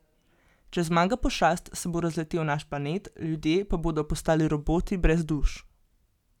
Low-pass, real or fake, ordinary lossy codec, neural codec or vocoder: 19.8 kHz; real; none; none